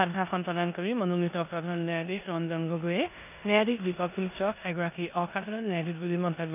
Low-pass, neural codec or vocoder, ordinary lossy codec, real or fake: 3.6 kHz; codec, 16 kHz in and 24 kHz out, 0.9 kbps, LongCat-Audio-Codec, four codebook decoder; none; fake